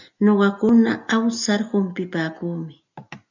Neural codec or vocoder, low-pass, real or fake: none; 7.2 kHz; real